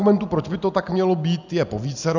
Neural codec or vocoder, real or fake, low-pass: none; real; 7.2 kHz